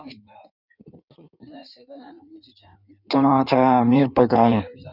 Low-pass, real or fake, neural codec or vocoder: 5.4 kHz; fake; codec, 16 kHz in and 24 kHz out, 1.1 kbps, FireRedTTS-2 codec